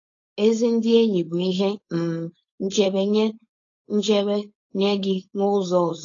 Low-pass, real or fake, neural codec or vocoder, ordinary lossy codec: 7.2 kHz; fake; codec, 16 kHz, 4.8 kbps, FACodec; AAC, 32 kbps